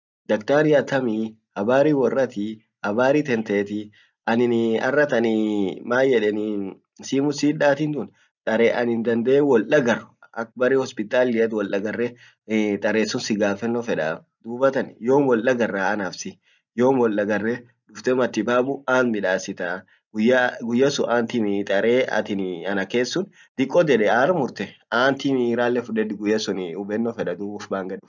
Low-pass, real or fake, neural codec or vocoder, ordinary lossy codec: 7.2 kHz; real; none; none